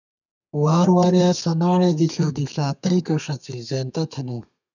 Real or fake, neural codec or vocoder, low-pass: fake; codec, 32 kHz, 1.9 kbps, SNAC; 7.2 kHz